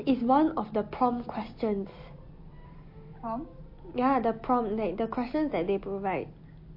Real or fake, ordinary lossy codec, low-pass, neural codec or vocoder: real; MP3, 32 kbps; 5.4 kHz; none